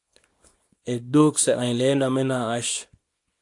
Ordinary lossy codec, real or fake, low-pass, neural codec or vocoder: AAC, 64 kbps; fake; 10.8 kHz; codec, 24 kHz, 0.9 kbps, WavTokenizer, small release